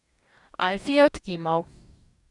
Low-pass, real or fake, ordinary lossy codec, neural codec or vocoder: 10.8 kHz; fake; none; codec, 44.1 kHz, 2.6 kbps, DAC